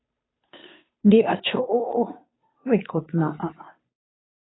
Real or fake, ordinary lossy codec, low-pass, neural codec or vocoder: fake; AAC, 16 kbps; 7.2 kHz; codec, 16 kHz, 2 kbps, FunCodec, trained on Chinese and English, 25 frames a second